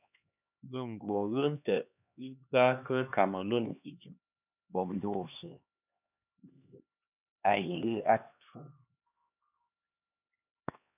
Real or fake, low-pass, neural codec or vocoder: fake; 3.6 kHz; codec, 16 kHz, 2 kbps, X-Codec, HuBERT features, trained on LibriSpeech